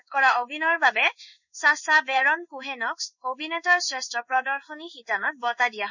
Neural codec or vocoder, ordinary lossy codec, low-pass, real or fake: codec, 16 kHz in and 24 kHz out, 1 kbps, XY-Tokenizer; none; 7.2 kHz; fake